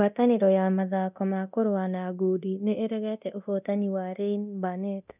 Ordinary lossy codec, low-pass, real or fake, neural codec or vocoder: none; 3.6 kHz; fake; codec, 24 kHz, 0.9 kbps, DualCodec